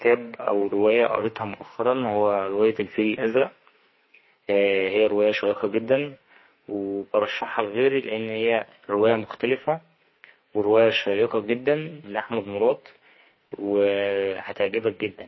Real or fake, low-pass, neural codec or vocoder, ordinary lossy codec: fake; 7.2 kHz; codec, 44.1 kHz, 2.6 kbps, SNAC; MP3, 24 kbps